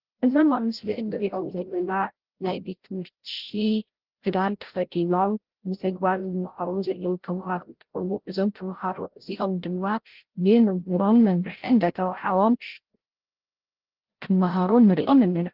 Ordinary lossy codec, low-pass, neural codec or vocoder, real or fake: Opus, 16 kbps; 5.4 kHz; codec, 16 kHz, 0.5 kbps, FreqCodec, larger model; fake